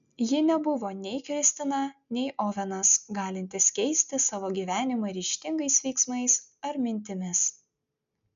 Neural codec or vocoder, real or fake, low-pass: none; real; 7.2 kHz